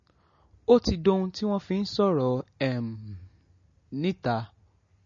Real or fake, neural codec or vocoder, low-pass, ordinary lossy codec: real; none; 7.2 kHz; MP3, 32 kbps